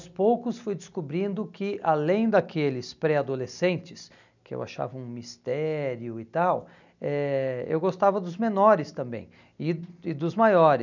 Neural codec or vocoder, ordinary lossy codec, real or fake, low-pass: none; none; real; 7.2 kHz